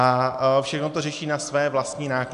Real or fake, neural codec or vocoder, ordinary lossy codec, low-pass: real; none; AAC, 96 kbps; 10.8 kHz